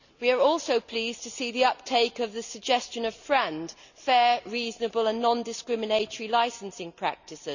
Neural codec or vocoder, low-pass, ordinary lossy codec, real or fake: none; 7.2 kHz; none; real